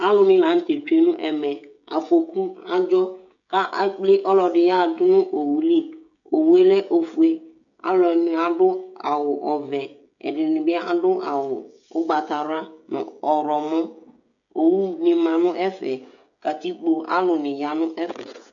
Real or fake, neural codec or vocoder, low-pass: fake; codec, 16 kHz, 16 kbps, FreqCodec, smaller model; 7.2 kHz